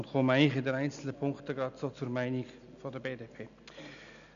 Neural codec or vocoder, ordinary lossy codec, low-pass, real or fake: none; none; 7.2 kHz; real